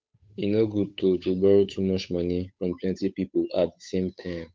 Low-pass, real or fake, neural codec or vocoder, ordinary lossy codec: none; fake; codec, 16 kHz, 8 kbps, FunCodec, trained on Chinese and English, 25 frames a second; none